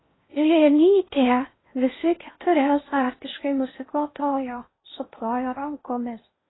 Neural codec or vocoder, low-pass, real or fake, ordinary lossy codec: codec, 16 kHz in and 24 kHz out, 0.6 kbps, FocalCodec, streaming, 4096 codes; 7.2 kHz; fake; AAC, 16 kbps